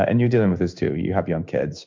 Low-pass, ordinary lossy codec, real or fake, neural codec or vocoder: 7.2 kHz; MP3, 64 kbps; fake; codec, 16 kHz in and 24 kHz out, 1 kbps, XY-Tokenizer